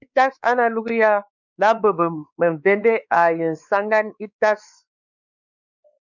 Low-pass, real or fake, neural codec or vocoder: 7.2 kHz; fake; codec, 16 kHz, 4 kbps, X-Codec, WavLM features, trained on Multilingual LibriSpeech